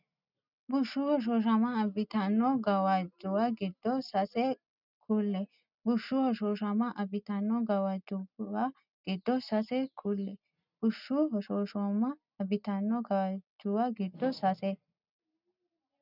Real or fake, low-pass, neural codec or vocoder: real; 5.4 kHz; none